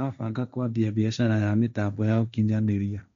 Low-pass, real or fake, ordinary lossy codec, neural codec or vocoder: 7.2 kHz; fake; none; codec, 16 kHz, 1.1 kbps, Voila-Tokenizer